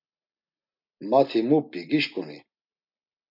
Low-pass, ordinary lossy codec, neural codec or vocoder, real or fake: 5.4 kHz; MP3, 48 kbps; none; real